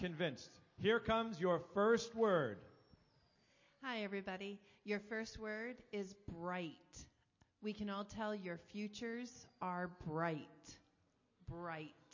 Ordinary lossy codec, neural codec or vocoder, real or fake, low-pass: MP3, 32 kbps; none; real; 7.2 kHz